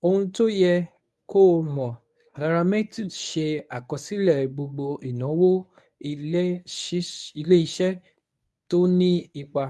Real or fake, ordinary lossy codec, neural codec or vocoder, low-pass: fake; none; codec, 24 kHz, 0.9 kbps, WavTokenizer, medium speech release version 1; none